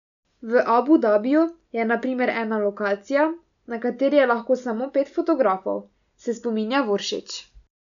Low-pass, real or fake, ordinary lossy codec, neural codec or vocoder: 7.2 kHz; real; none; none